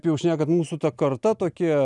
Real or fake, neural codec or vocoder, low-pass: real; none; 10.8 kHz